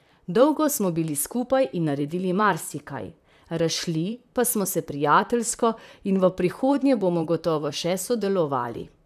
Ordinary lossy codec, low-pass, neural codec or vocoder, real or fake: none; 14.4 kHz; vocoder, 44.1 kHz, 128 mel bands, Pupu-Vocoder; fake